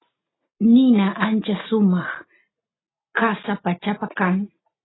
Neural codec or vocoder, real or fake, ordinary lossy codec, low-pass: none; real; AAC, 16 kbps; 7.2 kHz